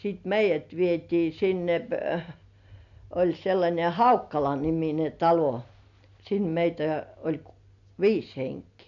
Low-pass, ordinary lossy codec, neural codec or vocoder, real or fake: 7.2 kHz; none; none; real